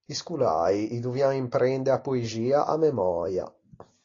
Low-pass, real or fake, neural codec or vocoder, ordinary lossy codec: 7.2 kHz; real; none; AAC, 32 kbps